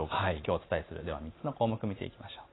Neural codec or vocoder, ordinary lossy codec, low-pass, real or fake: none; AAC, 16 kbps; 7.2 kHz; real